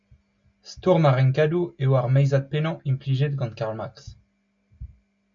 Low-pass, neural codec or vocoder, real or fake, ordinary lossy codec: 7.2 kHz; none; real; MP3, 48 kbps